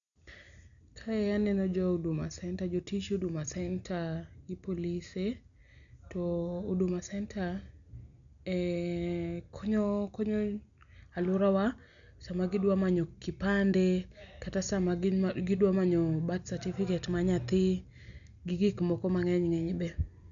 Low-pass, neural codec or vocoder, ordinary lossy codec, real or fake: 7.2 kHz; none; none; real